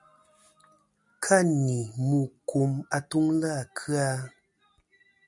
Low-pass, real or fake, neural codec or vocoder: 10.8 kHz; real; none